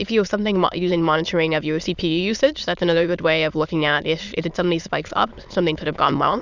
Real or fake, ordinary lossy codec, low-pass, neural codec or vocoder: fake; Opus, 64 kbps; 7.2 kHz; autoencoder, 22.05 kHz, a latent of 192 numbers a frame, VITS, trained on many speakers